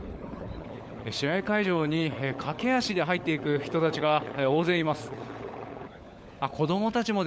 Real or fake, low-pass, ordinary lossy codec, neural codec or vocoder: fake; none; none; codec, 16 kHz, 16 kbps, FunCodec, trained on LibriTTS, 50 frames a second